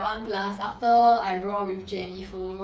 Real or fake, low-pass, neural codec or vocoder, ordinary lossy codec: fake; none; codec, 16 kHz, 4 kbps, FreqCodec, smaller model; none